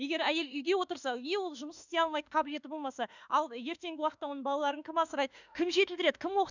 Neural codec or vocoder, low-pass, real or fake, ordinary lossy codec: autoencoder, 48 kHz, 32 numbers a frame, DAC-VAE, trained on Japanese speech; 7.2 kHz; fake; none